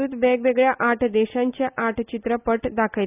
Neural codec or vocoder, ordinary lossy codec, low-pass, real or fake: none; none; 3.6 kHz; real